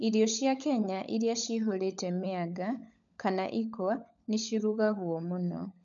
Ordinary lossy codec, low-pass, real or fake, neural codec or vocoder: none; 7.2 kHz; fake; codec, 16 kHz, 16 kbps, FunCodec, trained on LibriTTS, 50 frames a second